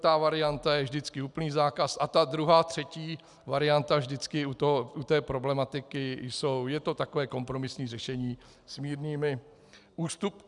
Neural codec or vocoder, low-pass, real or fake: none; 10.8 kHz; real